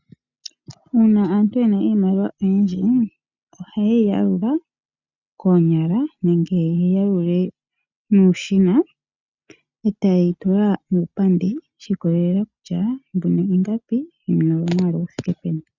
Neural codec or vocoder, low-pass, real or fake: none; 7.2 kHz; real